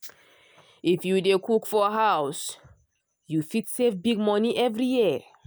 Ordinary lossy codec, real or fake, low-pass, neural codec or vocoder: none; real; none; none